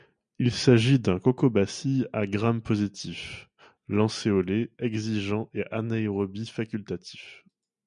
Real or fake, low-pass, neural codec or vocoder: real; 9.9 kHz; none